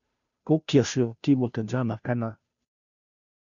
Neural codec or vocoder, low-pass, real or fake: codec, 16 kHz, 0.5 kbps, FunCodec, trained on Chinese and English, 25 frames a second; 7.2 kHz; fake